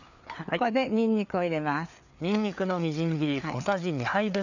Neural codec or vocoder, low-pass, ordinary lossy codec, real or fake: codec, 16 kHz, 4 kbps, FreqCodec, larger model; 7.2 kHz; none; fake